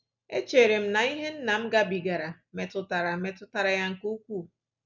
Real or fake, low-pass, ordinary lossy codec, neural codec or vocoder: real; 7.2 kHz; none; none